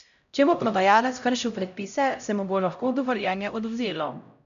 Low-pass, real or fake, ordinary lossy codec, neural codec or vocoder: 7.2 kHz; fake; none; codec, 16 kHz, 0.5 kbps, X-Codec, HuBERT features, trained on LibriSpeech